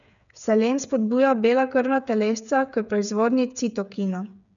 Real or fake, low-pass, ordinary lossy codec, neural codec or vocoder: fake; 7.2 kHz; none; codec, 16 kHz, 8 kbps, FreqCodec, smaller model